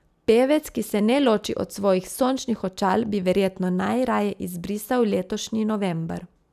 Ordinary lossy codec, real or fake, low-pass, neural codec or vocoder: none; real; 14.4 kHz; none